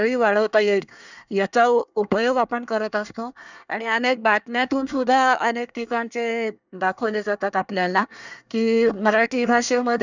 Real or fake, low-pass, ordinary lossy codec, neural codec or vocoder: fake; 7.2 kHz; none; codec, 24 kHz, 1 kbps, SNAC